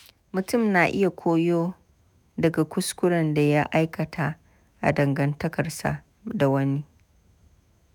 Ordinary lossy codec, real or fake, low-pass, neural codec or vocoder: none; fake; 19.8 kHz; autoencoder, 48 kHz, 128 numbers a frame, DAC-VAE, trained on Japanese speech